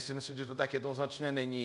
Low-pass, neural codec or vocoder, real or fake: 10.8 kHz; codec, 24 kHz, 0.5 kbps, DualCodec; fake